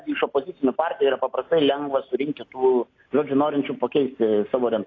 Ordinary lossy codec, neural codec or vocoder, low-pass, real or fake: AAC, 32 kbps; none; 7.2 kHz; real